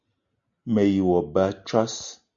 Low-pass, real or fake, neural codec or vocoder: 7.2 kHz; real; none